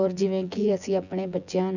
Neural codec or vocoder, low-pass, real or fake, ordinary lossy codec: vocoder, 24 kHz, 100 mel bands, Vocos; 7.2 kHz; fake; none